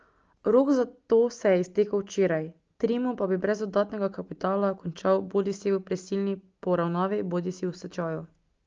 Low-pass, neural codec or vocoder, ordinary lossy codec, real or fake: 7.2 kHz; none; Opus, 24 kbps; real